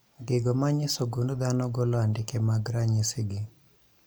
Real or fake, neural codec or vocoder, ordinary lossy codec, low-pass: real; none; none; none